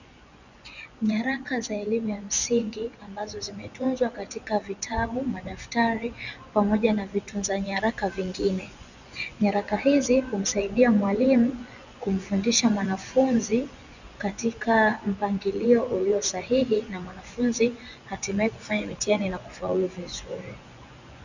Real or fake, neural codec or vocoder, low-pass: fake; vocoder, 24 kHz, 100 mel bands, Vocos; 7.2 kHz